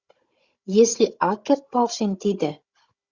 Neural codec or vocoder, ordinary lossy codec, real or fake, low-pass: codec, 16 kHz, 16 kbps, FunCodec, trained on Chinese and English, 50 frames a second; Opus, 64 kbps; fake; 7.2 kHz